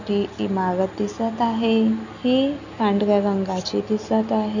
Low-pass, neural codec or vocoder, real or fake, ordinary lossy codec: 7.2 kHz; none; real; none